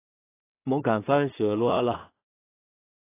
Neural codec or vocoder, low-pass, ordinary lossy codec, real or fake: codec, 16 kHz in and 24 kHz out, 0.4 kbps, LongCat-Audio-Codec, two codebook decoder; 3.6 kHz; AAC, 24 kbps; fake